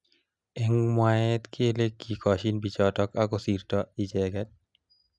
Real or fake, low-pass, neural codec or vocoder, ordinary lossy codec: real; none; none; none